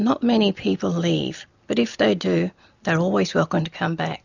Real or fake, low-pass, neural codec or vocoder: real; 7.2 kHz; none